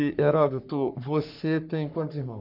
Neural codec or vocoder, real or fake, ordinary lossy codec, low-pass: codec, 44.1 kHz, 3.4 kbps, Pupu-Codec; fake; none; 5.4 kHz